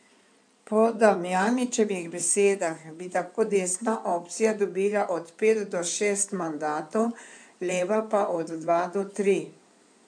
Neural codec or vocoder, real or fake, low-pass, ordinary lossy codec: codec, 16 kHz in and 24 kHz out, 2.2 kbps, FireRedTTS-2 codec; fake; 9.9 kHz; none